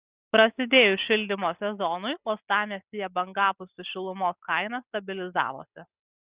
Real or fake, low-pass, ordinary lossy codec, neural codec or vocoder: real; 3.6 kHz; Opus, 64 kbps; none